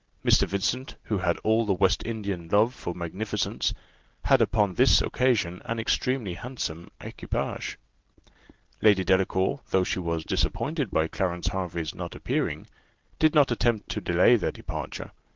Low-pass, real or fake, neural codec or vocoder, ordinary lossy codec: 7.2 kHz; real; none; Opus, 16 kbps